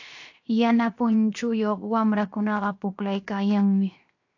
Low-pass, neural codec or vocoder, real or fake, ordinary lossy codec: 7.2 kHz; codec, 16 kHz, 0.7 kbps, FocalCodec; fake; AAC, 48 kbps